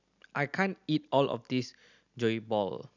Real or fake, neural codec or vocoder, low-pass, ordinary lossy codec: real; none; 7.2 kHz; none